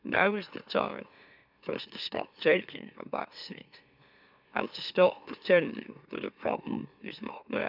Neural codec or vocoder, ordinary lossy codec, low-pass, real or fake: autoencoder, 44.1 kHz, a latent of 192 numbers a frame, MeloTTS; none; 5.4 kHz; fake